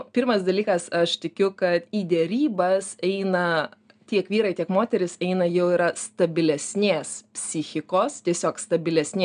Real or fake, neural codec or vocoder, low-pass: real; none; 9.9 kHz